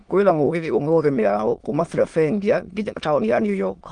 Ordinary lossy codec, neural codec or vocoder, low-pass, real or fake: Opus, 24 kbps; autoencoder, 22.05 kHz, a latent of 192 numbers a frame, VITS, trained on many speakers; 9.9 kHz; fake